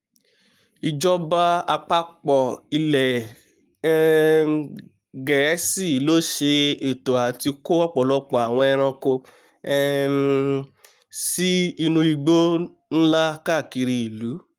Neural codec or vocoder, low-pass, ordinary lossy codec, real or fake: codec, 44.1 kHz, 7.8 kbps, Pupu-Codec; 19.8 kHz; Opus, 32 kbps; fake